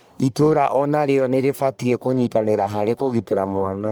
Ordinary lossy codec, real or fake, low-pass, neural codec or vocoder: none; fake; none; codec, 44.1 kHz, 1.7 kbps, Pupu-Codec